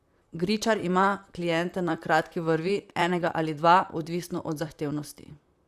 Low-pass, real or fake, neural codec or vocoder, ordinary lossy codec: 14.4 kHz; fake; vocoder, 44.1 kHz, 128 mel bands, Pupu-Vocoder; Opus, 64 kbps